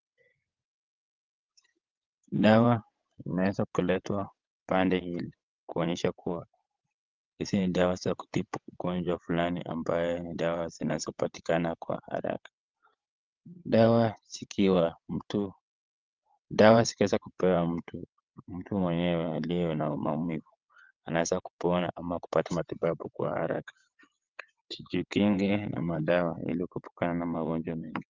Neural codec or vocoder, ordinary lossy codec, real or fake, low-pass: vocoder, 22.05 kHz, 80 mel bands, WaveNeXt; Opus, 24 kbps; fake; 7.2 kHz